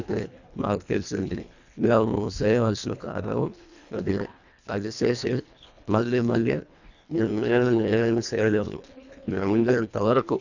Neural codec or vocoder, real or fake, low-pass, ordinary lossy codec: codec, 24 kHz, 1.5 kbps, HILCodec; fake; 7.2 kHz; none